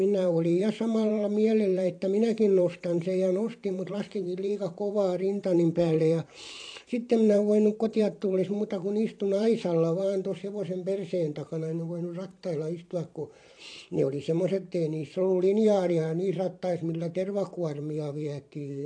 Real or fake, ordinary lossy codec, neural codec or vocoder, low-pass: fake; AAC, 48 kbps; vocoder, 44.1 kHz, 128 mel bands every 512 samples, BigVGAN v2; 9.9 kHz